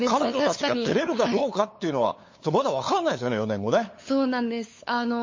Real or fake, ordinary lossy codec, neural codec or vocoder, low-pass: fake; MP3, 32 kbps; codec, 16 kHz, 8 kbps, FunCodec, trained on LibriTTS, 25 frames a second; 7.2 kHz